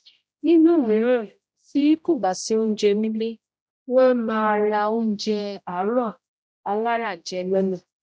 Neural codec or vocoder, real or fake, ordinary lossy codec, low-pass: codec, 16 kHz, 0.5 kbps, X-Codec, HuBERT features, trained on general audio; fake; none; none